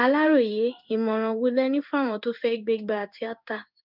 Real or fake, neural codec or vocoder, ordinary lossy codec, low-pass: fake; codec, 16 kHz in and 24 kHz out, 1 kbps, XY-Tokenizer; none; 5.4 kHz